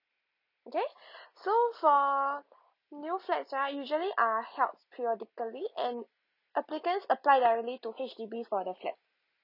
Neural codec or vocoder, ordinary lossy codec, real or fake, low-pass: none; none; real; 5.4 kHz